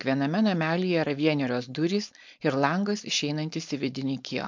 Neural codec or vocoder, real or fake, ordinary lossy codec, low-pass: codec, 16 kHz, 4.8 kbps, FACodec; fake; MP3, 64 kbps; 7.2 kHz